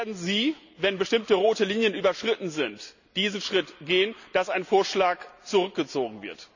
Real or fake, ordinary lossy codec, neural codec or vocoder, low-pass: real; none; none; 7.2 kHz